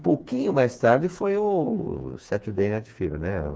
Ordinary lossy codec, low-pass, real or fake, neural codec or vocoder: none; none; fake; codec, 16 kHz, 4 kbps, FreqCodec, smaller model